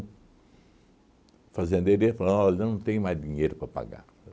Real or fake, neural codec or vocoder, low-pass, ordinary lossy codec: real; none; none; none